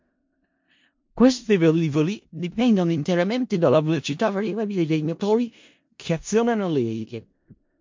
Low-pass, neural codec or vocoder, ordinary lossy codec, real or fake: 7.2 kHz; codec, 16 kHz in and 24 kHz out, 0.4 kbps, LongCat-Audio-Codec, four codebook decoder; MP3, 48 kbps; fake